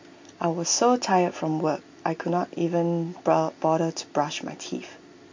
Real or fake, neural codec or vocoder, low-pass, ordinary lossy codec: real; none; 7.2 kHz; MP3, 48 kbps